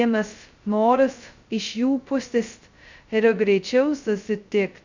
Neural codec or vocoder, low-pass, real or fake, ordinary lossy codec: codec, 16 kHz, 0.2 kbps, FocalCodec; 7.2 kHz; fake; Opus, 64 kbps